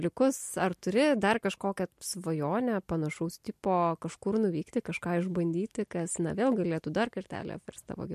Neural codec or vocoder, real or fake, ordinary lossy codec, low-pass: none; real; MP3, 64 kbps; 14.4 kHz